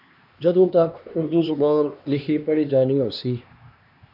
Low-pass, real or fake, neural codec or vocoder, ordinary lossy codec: 5.4 kHz; fake; codec, 16 kHz, 2 kbps, X-Codec, HuBERT features, trained on LibriSpeech; MP3, 48 kbps